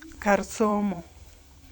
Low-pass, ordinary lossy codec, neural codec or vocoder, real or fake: 19.8 kHz; none; vocoder, 44.1 kHz, 128 mel bands every 512 samples, BigVGAN v2; fake